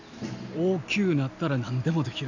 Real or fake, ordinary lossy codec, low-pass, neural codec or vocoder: real; none; 7.2 kHz; none